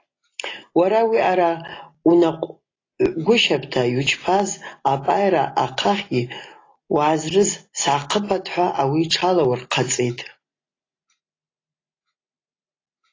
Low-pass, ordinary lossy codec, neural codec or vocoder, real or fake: 7.2 kHz; AAC, 32 kbps; none; real